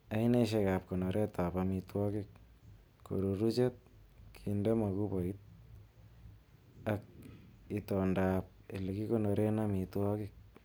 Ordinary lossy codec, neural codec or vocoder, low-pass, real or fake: none; none; none; real